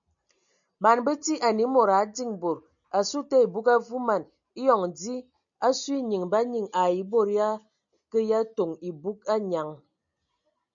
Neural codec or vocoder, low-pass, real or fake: none; 7.2 kHz; real